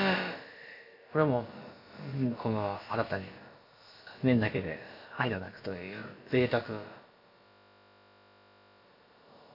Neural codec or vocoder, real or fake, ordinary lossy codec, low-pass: codec, 16 kHz, about 1 kbps, DyCAST, with the encoder's durations; fake; none; 5.4 kHz